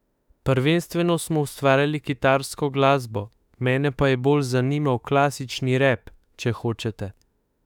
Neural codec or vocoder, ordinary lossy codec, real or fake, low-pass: autoencoder, 48 kHz, 32 numbers a frame, DAC-VAE, trained on Japanese speech; none; fake; 19.8 kHz